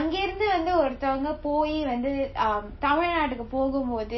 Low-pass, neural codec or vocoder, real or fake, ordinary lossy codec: 7.2 kHz; none; real; MP3, 24 kbps